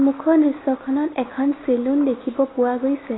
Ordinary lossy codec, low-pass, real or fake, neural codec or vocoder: AAC, 16 kbps; 7.2 kHz; real; none